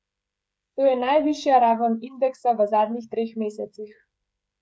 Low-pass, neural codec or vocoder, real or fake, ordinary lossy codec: none; codec, 16 kHz, 16 kbps, FreqCodec, smaller model; fake; none